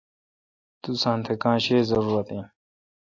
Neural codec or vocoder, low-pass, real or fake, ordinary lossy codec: none; 7.2 kHz; real; AAC, 48 kbps